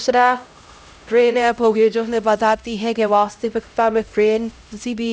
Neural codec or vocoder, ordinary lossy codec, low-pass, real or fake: codec, 16 kHz, 0.5 kbps, X-Codec, HuBERT features, trained on LibriSpeech; none; none; fake